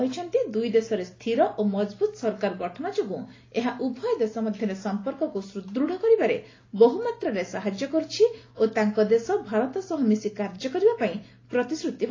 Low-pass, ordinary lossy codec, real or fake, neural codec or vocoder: 7.2 kHz; AAC, 32 kbps; real; none